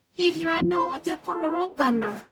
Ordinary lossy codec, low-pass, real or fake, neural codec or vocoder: none; 19.8 kHz; fake; codec, 44.1 kHz, 0.9 kbps, DAC